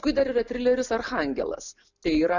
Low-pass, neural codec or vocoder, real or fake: 7.2 kHz; none; real